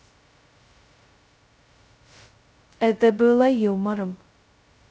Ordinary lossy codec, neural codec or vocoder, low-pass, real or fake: none; codec, 16 kHz, 0.2 kbps, FocalCodec; none; fake